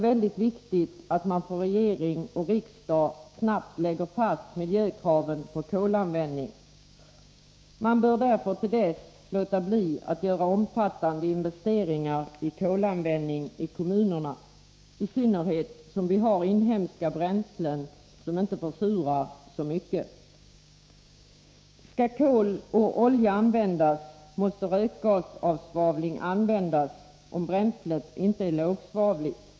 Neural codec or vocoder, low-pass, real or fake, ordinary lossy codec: codec, 16 kHz, 6 kbps, DAC; none; fake; none